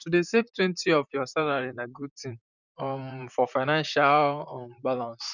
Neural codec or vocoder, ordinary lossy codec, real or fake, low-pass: vocoder, 44.1 kHz, 128 mel bands, Pupu-Vocoder; none; fake; 7.2 kHz